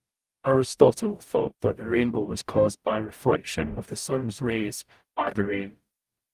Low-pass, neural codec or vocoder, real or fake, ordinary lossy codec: 14.4 kHz; codec, 44.1 kHz, 0.9 kbps, DAC; fake; Opus, 32 kbps